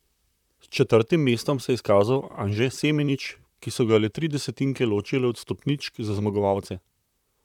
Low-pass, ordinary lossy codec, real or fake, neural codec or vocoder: 19.8 kHz; none; fake; vocoder, 44.1 kHz, 128 mel bands, Pupu-Vocoder